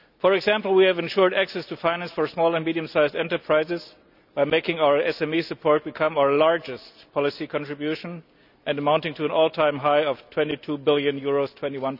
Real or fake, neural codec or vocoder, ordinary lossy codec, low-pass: real; none; none; 5.4 kHz